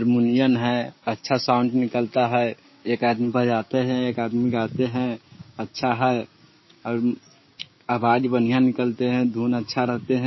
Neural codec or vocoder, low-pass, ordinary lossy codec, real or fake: codec, 16 kHz, 6 kbps, DAC; 7.2 kHz; MP3, 24 kbps; fake